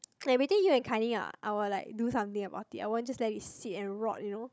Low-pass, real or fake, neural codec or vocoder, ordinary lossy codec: none; fake; codec, 16 kHz, 16 kbps, FunCodec, trained on Chinese and English, 50 frames a second; none